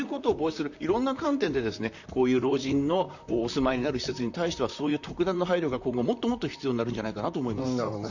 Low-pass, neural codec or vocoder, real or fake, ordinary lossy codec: 7.2 kHz; vocoder, 44.1 kHz, 128 mel bands, Pupu-Vocoder; fake; none